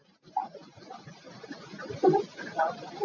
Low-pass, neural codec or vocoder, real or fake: 7.2 kHz; none; real